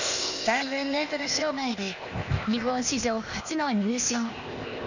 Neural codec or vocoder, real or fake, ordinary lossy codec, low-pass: codec, 16 kHz, 0.8 kbps, ZipCodec; fake; none; 7.2 kHz